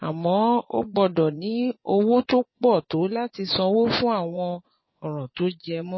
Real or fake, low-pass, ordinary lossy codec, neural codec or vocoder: fake; 7.2 kHz; MP3, 24 kbps; vocoder, 24 kHz, 100 mel bands, Vocos